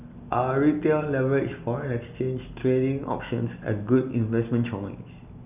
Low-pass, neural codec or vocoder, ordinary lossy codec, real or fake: 3.6 kHz; none; none; real